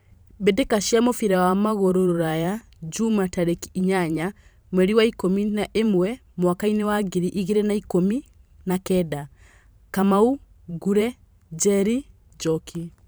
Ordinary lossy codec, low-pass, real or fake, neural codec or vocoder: none; none; fake; vocoder, 44.1 kHz, 128 mel bands every 512 samples, BigVGAN v2